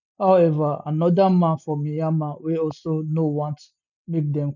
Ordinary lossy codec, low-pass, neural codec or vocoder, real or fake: none; 7.2 kHz; none; real